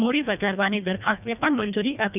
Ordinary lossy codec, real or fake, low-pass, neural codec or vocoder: none; fake; 3.6 kHz; codec, 24 kHz, 1.5 kbps, HILCodec